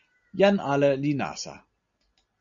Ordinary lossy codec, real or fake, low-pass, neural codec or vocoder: Opus, 64 kbps; real; 7.2 kHz; none